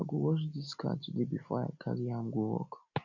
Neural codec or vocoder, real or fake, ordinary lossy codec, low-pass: none; real; none; 7.2 kHz